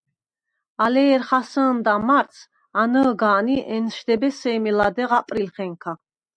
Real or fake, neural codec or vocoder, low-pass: real; none; 9.9 kHz